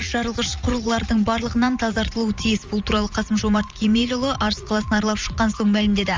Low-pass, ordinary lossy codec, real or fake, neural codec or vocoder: 7.2 kHz; Opus, 32 kbps; fake; vocoder, 44.1 kHz, 80 mel bands, Vocos